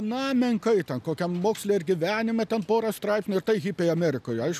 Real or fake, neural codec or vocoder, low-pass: real; none; 14.4 kHz